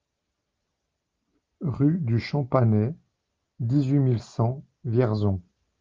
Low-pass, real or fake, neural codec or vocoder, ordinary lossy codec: 7.2 kHz; real; none; Opus, 24 kbps